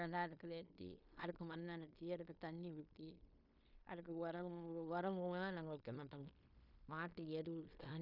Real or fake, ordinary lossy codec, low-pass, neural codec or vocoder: fake; none; 5.4 kHz; codec, 16 kHz in and 24 kHz out, 0.9 kbps, LongCat-Audio-Codec, fine tuned four codebook decoder